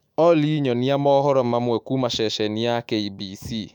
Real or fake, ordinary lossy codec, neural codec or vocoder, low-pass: fake; none; autoencoder, 48 kHz, 128 numbers a frame, DAC-VAE, trained on Japanese speech; 19.8 kHz